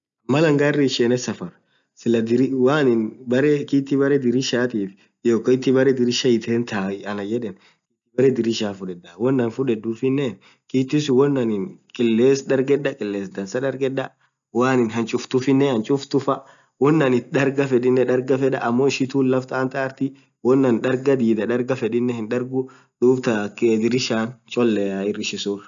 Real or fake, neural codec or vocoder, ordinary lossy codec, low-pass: real; none; none; 7.2 kHz